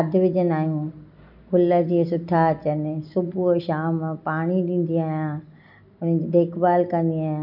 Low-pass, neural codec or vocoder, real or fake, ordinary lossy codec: 5.4 kHz; none; real; none